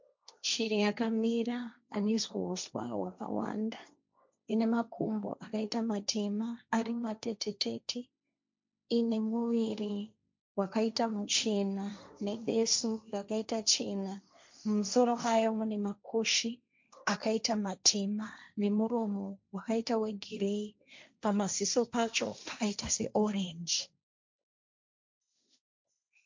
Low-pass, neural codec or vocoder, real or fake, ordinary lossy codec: 7.2 kHz; codec, 16 kHz, 1.1 kbps, Voila-Tokenizer; fake; MP3, 64 kbps